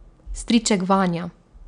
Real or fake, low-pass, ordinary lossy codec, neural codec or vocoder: real; 9.9 kHz; none; none